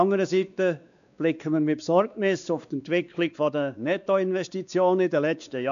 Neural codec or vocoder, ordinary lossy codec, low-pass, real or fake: codec, 16 kHz, 2 kbps, X-Codec, WavLM features, trained on Multilingual LibriSpeech; none; 7.2 kHz; fake